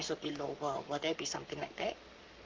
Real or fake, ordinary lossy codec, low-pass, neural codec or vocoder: fake; Opus, 32 kbps; 7.2 kHz; codec, 44.1 kHz, 7.8 kbps, Pupu-Codec